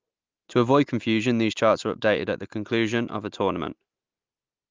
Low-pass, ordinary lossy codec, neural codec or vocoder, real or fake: 7.2 kHz; Opus, 32 kbps; none; real